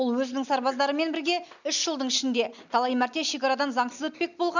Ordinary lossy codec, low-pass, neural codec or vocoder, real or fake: none; 7.2 kHz; none; real